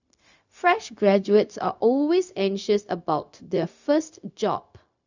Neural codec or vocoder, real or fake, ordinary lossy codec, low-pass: codec, 16 kHz, 0.4 kbps, LongCat-Audio-Codec; fake; none; 7.2 kHz